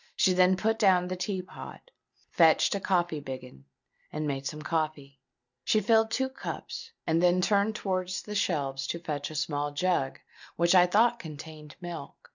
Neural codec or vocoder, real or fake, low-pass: none; real; 7.2 kHz